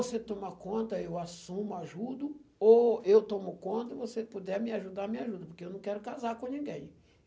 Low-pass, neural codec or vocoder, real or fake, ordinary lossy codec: none; none; real; none